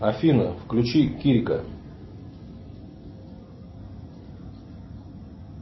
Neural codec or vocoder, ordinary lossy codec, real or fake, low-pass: none; MP3, 24 kbps; real; 7.2 kHz